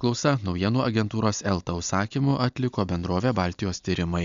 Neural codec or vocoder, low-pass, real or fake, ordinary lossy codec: none; 7.2 kHz; real; MP3, 64 kbps